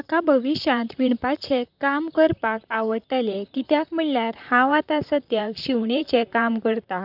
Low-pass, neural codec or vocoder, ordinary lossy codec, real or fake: 5.4 kHz; vocoder, 44.1 kHz, 128 mel bands, Pupu-Vocoder; none; fake